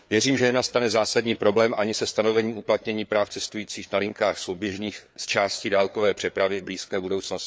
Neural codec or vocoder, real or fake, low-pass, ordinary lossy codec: codec, 16 kHz, 4 kbps, FreqCodec, larger model; fake; none; none